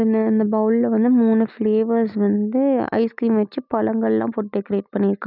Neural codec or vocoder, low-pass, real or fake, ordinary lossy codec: none; 5.4 kHz; real; none